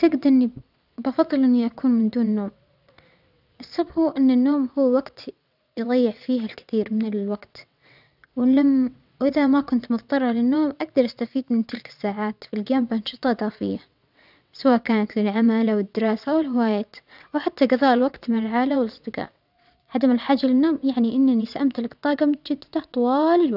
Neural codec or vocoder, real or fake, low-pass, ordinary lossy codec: vocoder, 22.05 kHz, 80 mel bands, WaveNeXt; fake; 5.4 kHz; none